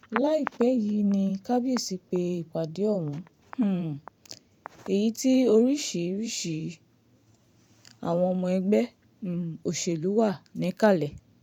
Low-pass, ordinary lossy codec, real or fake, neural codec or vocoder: 19.8 kHz; none; fake; vocoder, 44.1 kHz, 128 mel bands every 512 samples, BigVGAN v2